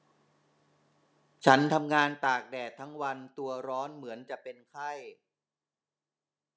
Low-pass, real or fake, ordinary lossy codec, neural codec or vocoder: none; real; none; none